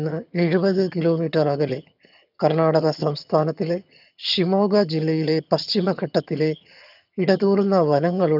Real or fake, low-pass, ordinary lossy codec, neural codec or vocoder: fake; 5.4 kHz; none; vocoder, 22.05 kHz, 80 mel bands, HiFi-GAN